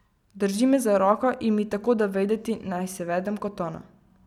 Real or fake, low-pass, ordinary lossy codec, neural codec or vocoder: real; 19.8 kHz; none; none